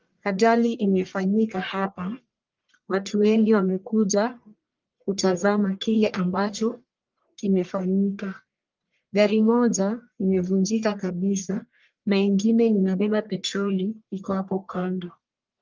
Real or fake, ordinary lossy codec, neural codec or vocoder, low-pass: fake; Opus, 24 kbps; codec, 44.1 kHz, 1.7 kbps, Pupu-Codec; 7.2 kHz